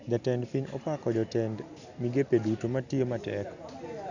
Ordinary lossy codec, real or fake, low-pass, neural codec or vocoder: none; real; 7.2 kHz; none